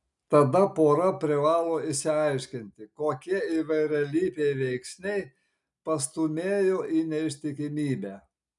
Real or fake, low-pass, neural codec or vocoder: real; 10.8 kHz; none